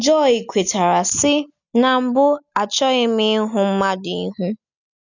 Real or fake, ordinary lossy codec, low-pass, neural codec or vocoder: real; none; 7.2 kHz; none